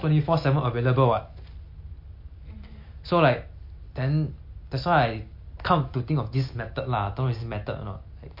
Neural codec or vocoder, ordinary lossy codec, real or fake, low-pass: none; MP3, 32 kbps; real; 5.4 kHz